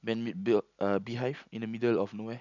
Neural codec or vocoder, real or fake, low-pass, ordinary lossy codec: none; real; 7.2 kHz; none